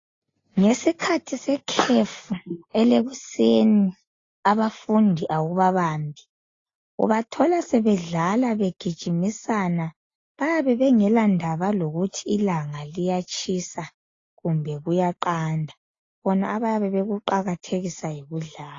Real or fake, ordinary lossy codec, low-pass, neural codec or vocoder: real; AAC, 32 kbps; 7.2 kHz; none